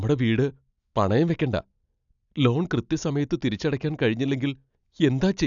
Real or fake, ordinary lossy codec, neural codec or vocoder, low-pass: real; none; none; 7.2 kHz